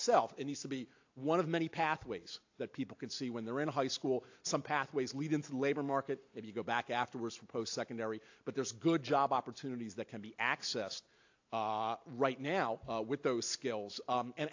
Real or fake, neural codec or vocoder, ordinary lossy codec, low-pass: real; none; AAC, 48 kbps; 7.2 kHz